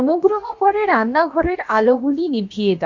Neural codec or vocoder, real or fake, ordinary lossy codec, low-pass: codec, 16 kHz, about 1 kbps, DyCAST, with the encoder's durations; fake; MP3, 48 kbps; 7.2 kHz